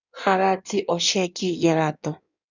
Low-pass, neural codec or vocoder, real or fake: 7.2 kHz; codec, 16 kHz in and 24 kHz out, 1.1 kbps, FireRedTTS-2 codec; fake